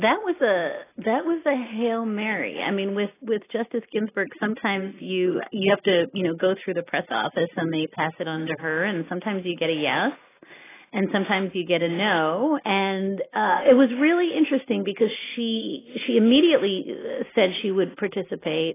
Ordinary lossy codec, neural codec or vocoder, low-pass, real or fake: AAC, 16 kbps; none; 3.6 kHz; real